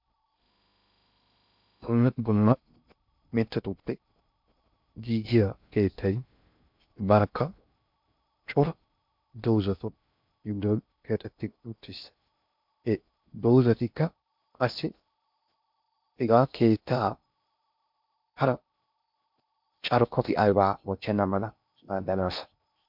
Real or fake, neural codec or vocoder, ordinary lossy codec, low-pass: fake; codec, 16 kHz in and 24 kHz out, 0.6 kbps, FocalCodec, streaming, 2048 codes; MP3, 48 kbps; 5.4 kHz